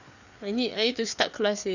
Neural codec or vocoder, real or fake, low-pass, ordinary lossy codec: codec, 16 kHz, 4 kbps, FreqCodec, larger model; fake; 7.2 kHz; none